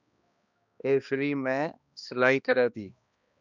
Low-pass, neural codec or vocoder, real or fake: 7.2 kHz; codec, 16 kHz, 1 kbps, X-Codec, HuBERT features, trained on balanced general audio; fake